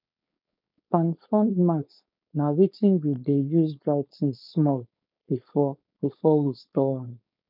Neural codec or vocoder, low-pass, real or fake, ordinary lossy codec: codec, 16 kHz, 4.8 kbps, FACodec; 5.4 kHz; fake; none